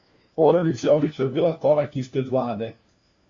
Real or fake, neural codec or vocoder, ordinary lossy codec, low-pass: fake; codec, 16 kHz, 1 kbps, FunCodec, trained on LibriTTS, 50 frames a second; Opus, 64 kbps; 7.2 kHz